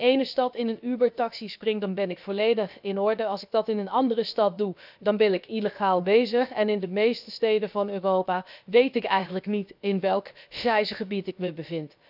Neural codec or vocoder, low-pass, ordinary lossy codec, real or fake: codec, 16 kHz, about 1 kbps, DyCAST, with the encoder's durations; 5.4 kHz; none; fake